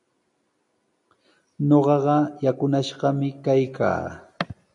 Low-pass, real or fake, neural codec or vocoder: 10.8 kHz; real; none